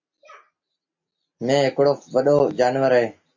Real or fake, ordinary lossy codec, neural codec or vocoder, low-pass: real; MP3, 48 kbps; none; 7.2 kHz